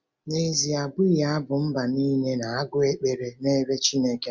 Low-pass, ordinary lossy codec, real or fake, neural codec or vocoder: none; none; real; none